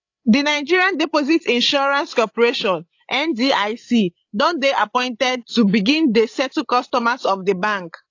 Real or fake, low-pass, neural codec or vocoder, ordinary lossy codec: fake; 7.2 kHz; codec, 16 kHz, 8 kbps, FreqCodec, larger model; AAC, 48 kbps